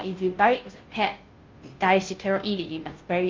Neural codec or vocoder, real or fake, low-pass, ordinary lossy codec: codec, 16 kHz, 0.5 kbps, FunCodec, trained on Chinese and English, 25 frames a second; fake; 7.2 kHz; Opus, 24 kbps